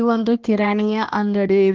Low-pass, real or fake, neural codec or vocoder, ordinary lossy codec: 7.2 kHz; fake; codec, 16 kHz, 1 kbps, X-Codec, HuBERT features, trained on balanced general audio; Opus, 16 kbps